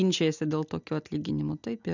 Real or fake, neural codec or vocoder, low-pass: real; none; 7.2 kHz